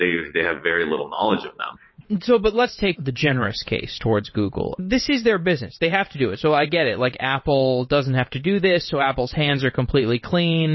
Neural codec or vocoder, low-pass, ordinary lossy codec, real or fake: vocoder, 22.05 kHz, 80 mel bands, WaveNeXt; 7.2 kHz; MP3, 24 kbps; fake